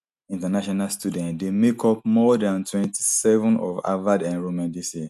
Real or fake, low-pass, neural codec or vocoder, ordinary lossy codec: real; 14.4 kHz; none; none